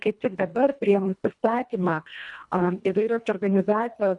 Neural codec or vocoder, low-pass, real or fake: codec, 24 kHz, 1.5 kbps, HILCodec; 10.8 kHz; fake